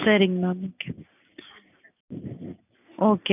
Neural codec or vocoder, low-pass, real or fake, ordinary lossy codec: none; 3.6 kHz; real; none